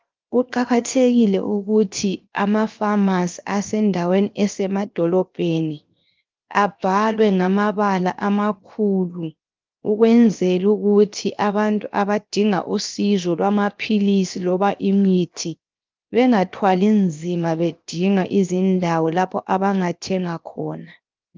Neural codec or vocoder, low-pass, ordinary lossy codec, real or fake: codec, 16 kHz, 0.7 kbps, FocalCodec; 7.2 kHz; Opus, 32 kbps; fake